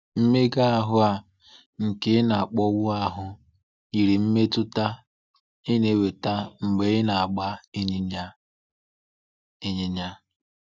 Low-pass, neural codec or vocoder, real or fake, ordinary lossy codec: none; none; real; none